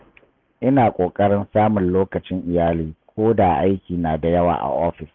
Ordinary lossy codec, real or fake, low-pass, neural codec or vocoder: Opus, 32 kbps; real; 7.2 kHz; none